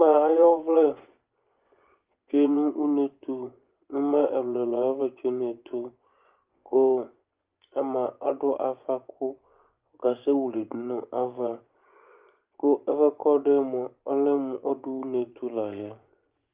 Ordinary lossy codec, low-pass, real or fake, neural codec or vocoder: Opus, 32 kbps; 3.6 kHz; fake; vocoder, 24 kHz, 100 mel bands, Vocos